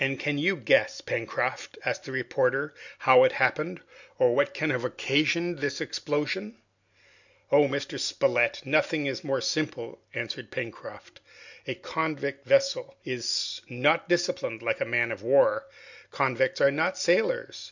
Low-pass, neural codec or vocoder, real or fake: 7.2 kHz; none; real